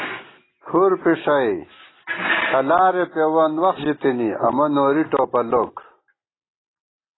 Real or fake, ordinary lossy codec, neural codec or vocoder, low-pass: real; AAC, 16 kbps; none; 7.2 kHz